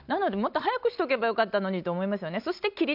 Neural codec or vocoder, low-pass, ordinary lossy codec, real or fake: none; 5.4 kHz; none; real